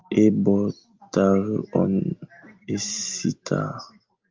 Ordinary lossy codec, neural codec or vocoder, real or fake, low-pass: Opus, 24 kbps; none; real; 7.2 kHz